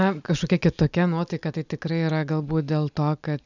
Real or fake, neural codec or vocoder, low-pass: real; none; 7.2 kHz